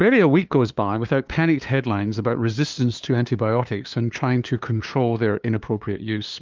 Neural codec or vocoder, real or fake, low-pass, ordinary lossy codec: codec, 16 kHz, 2 kbps, FunCodec, trained on LibriTTS, 25 frames a second; fake; 7.2 kHz; Opus, 24 kbps